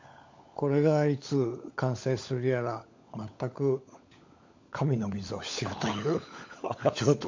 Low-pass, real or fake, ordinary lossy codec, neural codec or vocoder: 7.2 kHz; fake; MP3, 48 kbps; codec, 16 kHz, 16 kbps, FunCodec, trained on LibriTTS, 50 frames a second